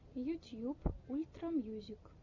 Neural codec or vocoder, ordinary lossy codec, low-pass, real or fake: none; MP3, 64 kbps; 7.2 kHz; real